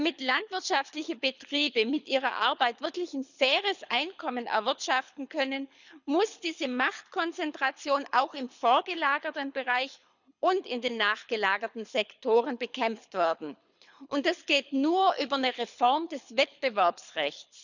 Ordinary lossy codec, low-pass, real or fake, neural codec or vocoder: none; 7.2 kHz; fake; codec, 24 kHz, 6 kbps, HILCodec